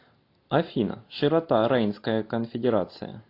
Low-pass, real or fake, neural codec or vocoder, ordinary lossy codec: 5.4 kHz; real; none; AAC, 32 kbps